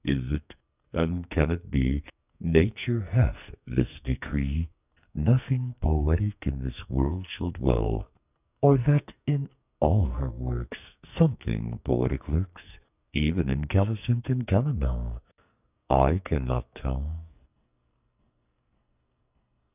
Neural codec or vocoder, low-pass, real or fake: codec, 44.1 kHz, 2.6 kbps, SNAC; 3.6 kHz; fake